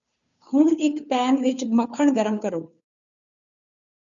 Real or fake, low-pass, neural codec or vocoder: fake; 7.2 kHz; codec, 16 kHz, 2 kbps, FunCodec, trained on Chinese and English, 25 frames a second